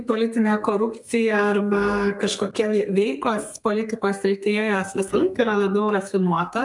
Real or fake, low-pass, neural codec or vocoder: fake; 10.8 kHz; codec, 32 kHz, 1.9 kbps, SNAC